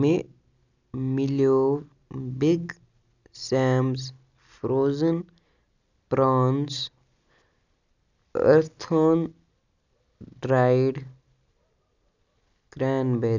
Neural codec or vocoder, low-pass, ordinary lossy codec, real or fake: none; 7.2 kHz; none; real